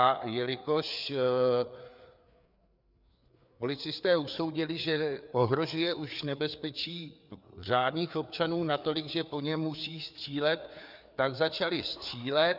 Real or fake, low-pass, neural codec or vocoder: fake; 5.4 kHz; codec, 16 kHz, 4 kbps, FreqCodec, larger model